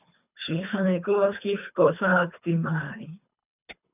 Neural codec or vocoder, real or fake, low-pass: codec, 24 kHz, 3 kbps, HILCodec; fake; 3.6 kHz